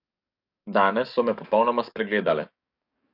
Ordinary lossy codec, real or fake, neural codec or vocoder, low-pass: none; fake; codec, 44.1 kHz, 7.8 kbps, DAC; 5.4 kHz